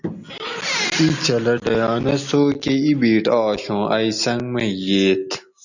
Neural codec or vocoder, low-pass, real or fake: none; 7.2 kHz; real